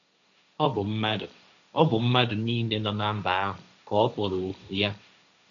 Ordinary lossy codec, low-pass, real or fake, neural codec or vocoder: none; 7.2 kHz; fake; codec, 16 kHz, 1.1 kbps, Voila-Tokenizer